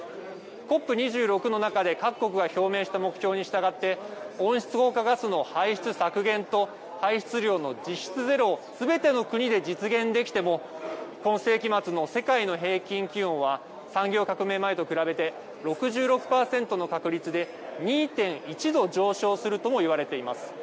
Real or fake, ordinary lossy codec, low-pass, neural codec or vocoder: real; none; none; none